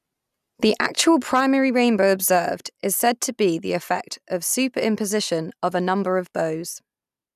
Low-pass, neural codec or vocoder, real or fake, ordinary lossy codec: 14.4 kHz; none; real; none